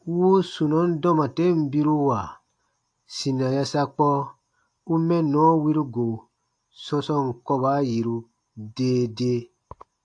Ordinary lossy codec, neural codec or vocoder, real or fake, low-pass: AAC, 64 kbps; none; real; 9.9 kHz